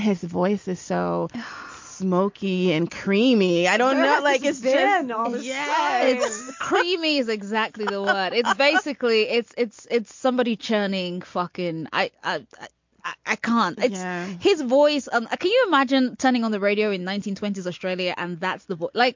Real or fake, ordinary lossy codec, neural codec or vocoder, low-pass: real; MP3, 48 kbps; none; 7.2 kHz